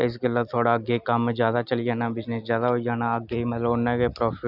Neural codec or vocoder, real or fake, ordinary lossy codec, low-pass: none; real; none; 5.4 kHz